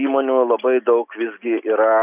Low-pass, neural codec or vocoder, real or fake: 3.6 kHz; none; real